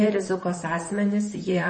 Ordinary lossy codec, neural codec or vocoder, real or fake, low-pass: MP3, 32 kbps; vocoder, 44.1 kHz, 128 mel bands, Pupu-Vocoder; fake; 9.9 kHz